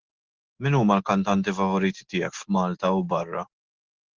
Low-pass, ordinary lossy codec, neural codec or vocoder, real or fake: 7.2 kHz; Opus, 16 kbps; none; real